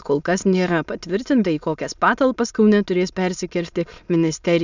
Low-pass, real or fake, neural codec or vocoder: 7.2 kHz; fake; autoencoder, 22.05 kHz, a latent of 192 numbers a frame, VITS, trained on many speakers